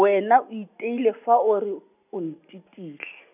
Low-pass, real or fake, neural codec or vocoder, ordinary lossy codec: 3.6 kHz; real; none; none